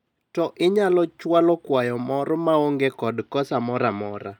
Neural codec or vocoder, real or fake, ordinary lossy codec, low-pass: none; real; none; 19.8 kHz